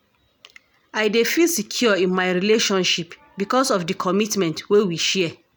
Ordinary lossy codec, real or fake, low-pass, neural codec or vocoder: none; real; none; none